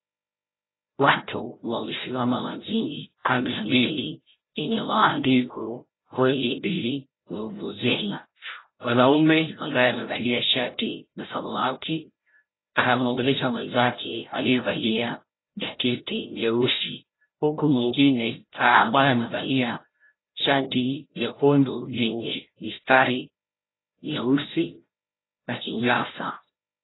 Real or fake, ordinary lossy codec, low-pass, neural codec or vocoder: fake; AAC, 16 kbps; 7.2 kHz; codec, 16 kHz, 0.5 kbps, FreqCodec, larger model